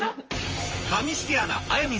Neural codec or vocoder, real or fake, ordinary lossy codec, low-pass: vocoder, 44.1 kHz, 128 mel bands, Pupu-Vocoder; fake; Opus, 24 kbps; 7.2 kHz